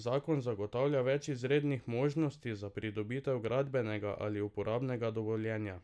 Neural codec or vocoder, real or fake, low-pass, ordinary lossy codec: none; real; none; none